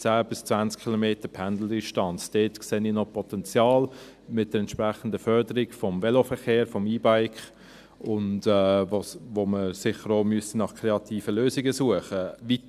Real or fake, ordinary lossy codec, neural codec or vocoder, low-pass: real; none; none; 14.4 kHz